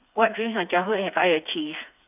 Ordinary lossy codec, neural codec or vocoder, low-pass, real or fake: none; codec, 16 kHz, 4 kbps, FreqCodec, smaller model; 3.6 kHz; fake